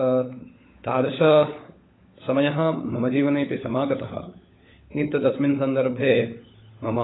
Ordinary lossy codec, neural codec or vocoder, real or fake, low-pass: AAC, 16 kbps; codec, 16 kHz, 16 kbps, FunCodec, trained on LibriTTS, 50 frames a second; fake; 7.2 kHz